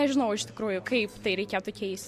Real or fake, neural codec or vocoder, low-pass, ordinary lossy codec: real; none; 14.4 kHz; MP3, 64 kbps